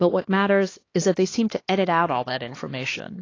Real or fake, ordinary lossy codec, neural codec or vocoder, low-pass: fake; AAC, 32 kbps; codec, 16 kHz, 2 kbps, X-Codec, HuBERT features, trained on LibriSpeech; 7.2 kHz